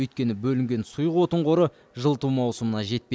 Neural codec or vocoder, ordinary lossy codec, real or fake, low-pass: none; none; real; none